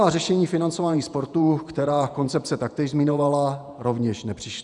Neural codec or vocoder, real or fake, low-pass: vocoder, 48 kHz, 128 mel bands, Vocos; fake; 10.8 kHz